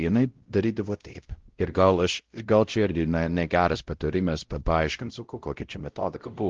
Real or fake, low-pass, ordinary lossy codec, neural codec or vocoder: fake; 7.2 kHz; Opus, 16 kbps; codec, 16 kHz, 0.5 kbps, X-Codec, WavLM features, trained on Multilingual LibriSpeech